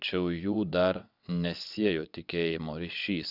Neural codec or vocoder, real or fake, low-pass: vocoder, 22.05 kHz, 80 mel bands, WaveNeXt; fake; 5.4 kHz